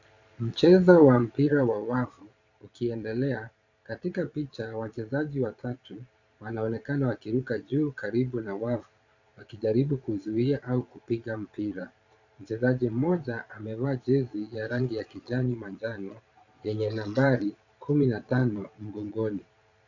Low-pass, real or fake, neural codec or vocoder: 7.2 kHz; fake; vocoder, 22.05 kHz, 80 mel bands, Vocos